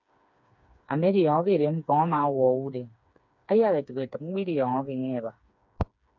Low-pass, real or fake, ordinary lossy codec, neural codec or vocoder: 7.2 kHz; fake; MP3, 64 kbps; codec, 16 kHz, 4 kbps, FreqCodec, smaller model